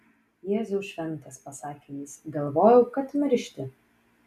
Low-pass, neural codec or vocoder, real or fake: 14.4 kHz; none; real